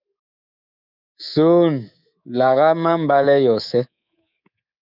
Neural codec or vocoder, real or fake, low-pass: codec, 24 kHz, 3.1 kbps, DualCodec; fake; 5.4 kHz